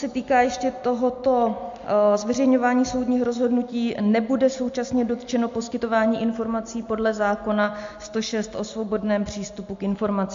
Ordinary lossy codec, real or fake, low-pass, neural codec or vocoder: MP3, 48 kbps; real; 7.2 kHz; none